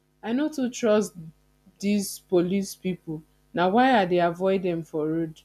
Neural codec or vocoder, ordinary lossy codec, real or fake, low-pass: none; none; real; 14.4 kHz